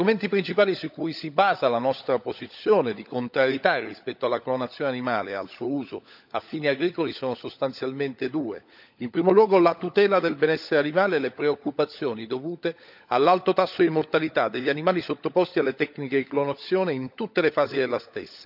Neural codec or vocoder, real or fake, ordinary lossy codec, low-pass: codec, 16 kHz, 16 kbps, FunCodec, trained on LibriTTS, 50 frames a second; fake; none; 5.4 kHz